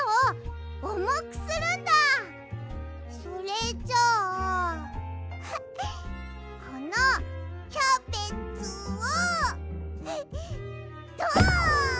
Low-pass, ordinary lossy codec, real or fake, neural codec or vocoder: none; none; real; none